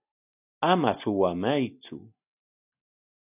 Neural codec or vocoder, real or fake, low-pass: none; real; 3.6 kHz